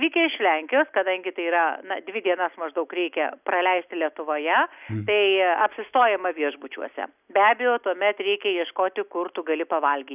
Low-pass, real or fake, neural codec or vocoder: 3.6 kHz; real; none